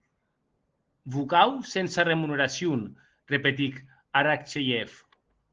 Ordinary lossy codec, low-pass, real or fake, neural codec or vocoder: Opus, 16 kbps; 7.2 kHz; real; none